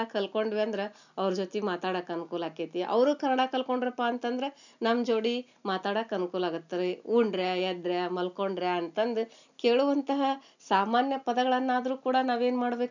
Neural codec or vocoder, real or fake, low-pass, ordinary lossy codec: none; real; 7.2 kHz; none